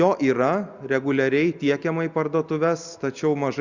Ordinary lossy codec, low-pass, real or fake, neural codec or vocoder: Opus, 64 kbps; 7.2 kHz; real; none